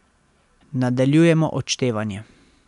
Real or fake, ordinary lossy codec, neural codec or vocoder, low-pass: real; none; none; 10.8 kHz